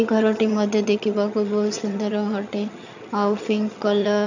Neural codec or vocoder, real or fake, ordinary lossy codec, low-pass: vocoder, 22.05 kHz, 80 mel bands, HiFi-GAN; fake; none; 7.2 kHz